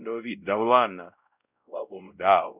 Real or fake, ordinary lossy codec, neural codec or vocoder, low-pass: fake; none; codec, 16 kHz, 0.5 kbps, X-Codec, WavLM features, trained on Multilingual LibriSpeech; 3.6 kHz